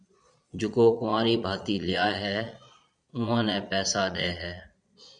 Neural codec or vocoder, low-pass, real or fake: vocoder, 22.05 kHz, 80 mel bands, Vocos; 9.9 kHz; fake